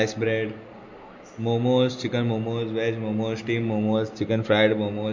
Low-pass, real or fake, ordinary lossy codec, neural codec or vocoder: 7.2 kHz; real; MP3, 48 kbps; none